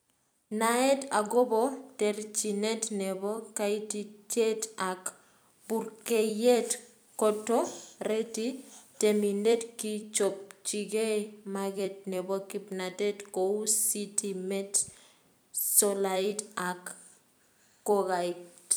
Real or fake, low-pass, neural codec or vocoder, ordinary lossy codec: fake; none; vocoder, 44.1 kHz, 128 mel bands every 256 samples, BigVGAN v2; none